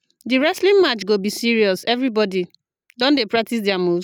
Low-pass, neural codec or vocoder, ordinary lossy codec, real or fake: 19.8 kHz; none; none; real